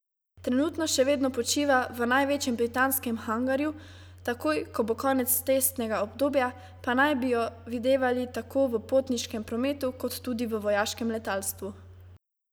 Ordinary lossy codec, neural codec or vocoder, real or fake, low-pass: none; none; real; none